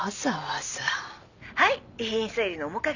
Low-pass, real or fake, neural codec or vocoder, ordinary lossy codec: 7.2 kHz; real; none; none